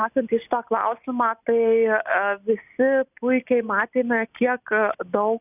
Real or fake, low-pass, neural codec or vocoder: real; 3.6 kHz; none